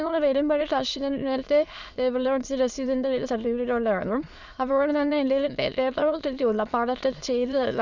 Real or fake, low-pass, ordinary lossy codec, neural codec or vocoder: fake; 7.2 kHz; none; autoencoder, 22.05 kHz, a latent of 192 numbers a frame, VITS, trained on many speakers